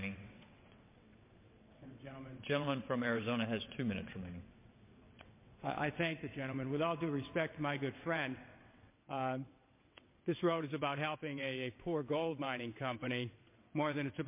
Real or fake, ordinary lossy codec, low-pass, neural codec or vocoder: fake; MP3, 24 kbps; 3.6 kHz; vocoder, 22.05 kHz, 80 mel bands, WaveNeXt